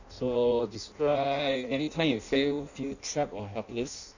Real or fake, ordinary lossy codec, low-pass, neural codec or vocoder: fake; none; 7.2 kHz; codec, 16 kHz in and 24 kHz out, 0.6 kbps, FireRedTTS-2 codec